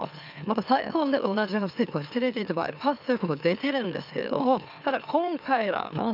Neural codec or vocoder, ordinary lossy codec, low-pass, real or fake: autoencoder, 44.1 kHz, a latent of 192 numbers a frame, MeloTTS; none; 5.4 kHz; fake